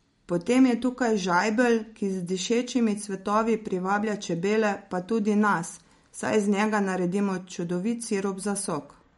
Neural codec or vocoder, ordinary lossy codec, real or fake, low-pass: none; MP3, 48 kbps; real; 19.8 kHz